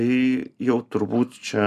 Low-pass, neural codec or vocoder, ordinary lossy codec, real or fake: 14.4 kHz; none; MP3, 96 kbps; real